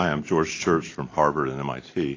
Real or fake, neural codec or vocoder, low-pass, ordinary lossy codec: real; none; 7.2 kHz; AAC, 32 kbps